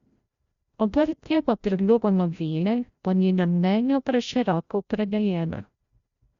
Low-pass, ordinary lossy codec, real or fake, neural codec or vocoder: 7.2 kHz; Opus, 64 kbps; fake; codec, 16 kHz, 0.5 kbps, FreqCodec, larger model